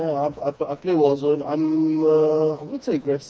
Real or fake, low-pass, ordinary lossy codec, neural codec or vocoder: fake; none; none; codec, 16 kHz, 2 kbps, FreqCodec, smaller model